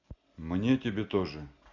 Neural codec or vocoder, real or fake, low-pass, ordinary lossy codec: none; real; 7.2 kHz; none